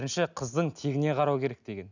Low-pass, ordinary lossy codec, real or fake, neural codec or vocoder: 7.2 kHz; none; real; none